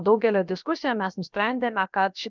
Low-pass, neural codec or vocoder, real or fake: 7.2 kHz; codec, 16 kHz, about 1 kbps, DyCAST, with the encoder's durations; fake